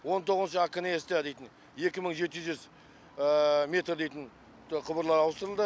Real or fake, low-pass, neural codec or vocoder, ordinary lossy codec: real; none; none; none